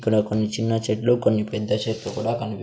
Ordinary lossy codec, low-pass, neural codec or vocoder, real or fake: none; none; none; real